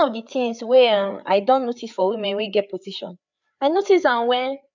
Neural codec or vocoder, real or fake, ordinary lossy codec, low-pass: codec, 16 kHz, 4 kbps, FreqCodec, larger model; fake; none; 7.2 kHz